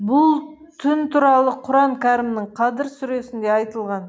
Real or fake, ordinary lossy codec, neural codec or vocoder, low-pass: real; none; none; none